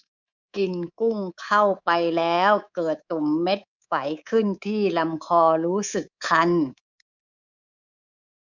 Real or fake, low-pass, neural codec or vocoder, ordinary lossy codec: fake; 7.2 kHz; codec, 24 kHz, 3.1 kbps, DualCodec; none